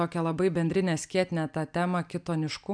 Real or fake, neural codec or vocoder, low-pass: real; none; 9.9 kHz